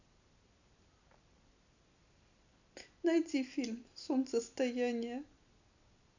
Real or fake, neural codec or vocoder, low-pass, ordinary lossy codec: real; none; 7.2 kHz; none